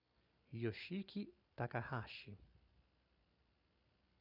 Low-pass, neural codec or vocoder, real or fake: 5.4 kHz; vocoder, 44.1 kHz, 128 mel bands every 256 samples, BigVGAN v2; fake